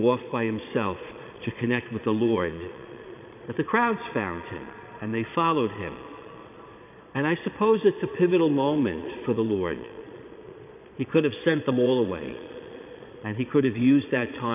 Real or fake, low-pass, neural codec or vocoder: fake; 3.6 kHz; vocoder, 22.05 kHz, 80 mel bands, Vocos